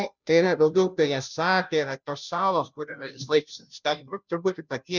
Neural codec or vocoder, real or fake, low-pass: codec, 16 kHz, 0.5 kbps, FunCodec, trained on Chinese and English, 25 frames a second; fake; 7.2 kHz